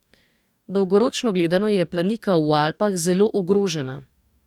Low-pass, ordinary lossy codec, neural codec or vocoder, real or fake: 19.8 kHz; none; codec, 44.1 kHz, 2.6 kbps, DAC; fake